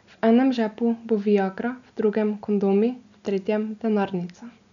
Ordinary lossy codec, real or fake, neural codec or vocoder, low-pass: none; real; none; 7.2 kHz